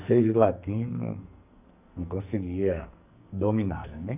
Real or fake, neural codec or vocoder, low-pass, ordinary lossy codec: fake; codec, 44.1 kHz, 2.6 kbps, SNAC; 3.6 kHz; none